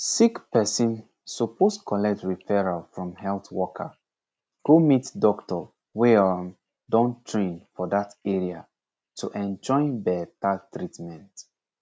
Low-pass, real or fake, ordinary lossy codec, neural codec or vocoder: none; real; none; none